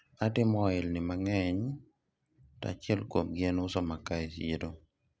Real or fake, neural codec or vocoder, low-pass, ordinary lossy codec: real; none; none; none